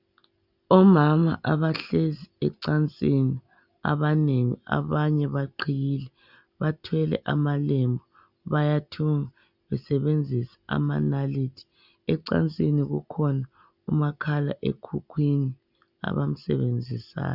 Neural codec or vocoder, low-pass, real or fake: none; 5.4 kHz; real